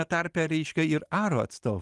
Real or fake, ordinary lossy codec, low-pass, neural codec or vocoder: real; Opus, 24 kbps; 10.8 kHz; none